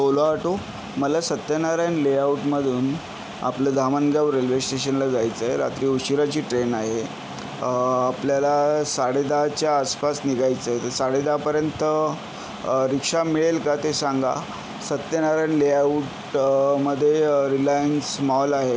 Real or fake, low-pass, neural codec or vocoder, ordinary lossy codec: real; none; none; none